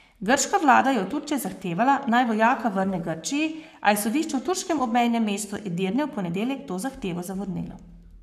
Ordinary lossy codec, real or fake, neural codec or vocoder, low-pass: none; fake; codec, 44.1 kHz, 7.8 kbps, Pupu-Codec; 14.4 kHz